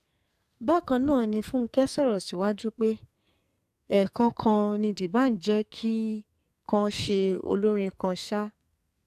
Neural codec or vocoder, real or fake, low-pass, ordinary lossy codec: codec, 44.1 kHz, 2.6 kbps, SNAC; fake; 14.4 kHz; AAC, 96 kbps